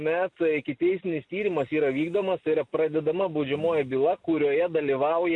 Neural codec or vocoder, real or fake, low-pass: none; real; 10.8 kHz